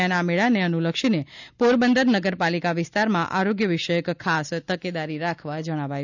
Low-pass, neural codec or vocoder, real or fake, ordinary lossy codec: 7.2 kHz; none; real; none